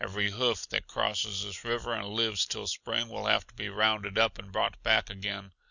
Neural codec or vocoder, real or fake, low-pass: none; real; 7.2 kHz